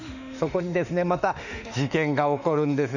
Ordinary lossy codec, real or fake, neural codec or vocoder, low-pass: none; fake; autoencoder, 48 kHz, 32 numbers a frame, DAC-VAE, trained on Japanese speech; 7.2 kHz